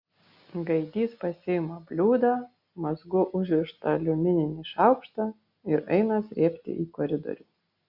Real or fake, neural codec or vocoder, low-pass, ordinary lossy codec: real; none; 5.4 kHz; Opus, 64 kbps